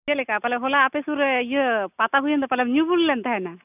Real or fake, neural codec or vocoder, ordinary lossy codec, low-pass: real; none; none; 3.6 kHz